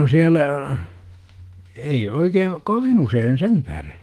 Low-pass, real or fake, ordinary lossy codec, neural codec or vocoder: 14.4 kHz; fake; Opus, 24 kbps; autoencoder, 48 kHz, 32 numbers a frame, DAC-VAE, trained on Japanese speech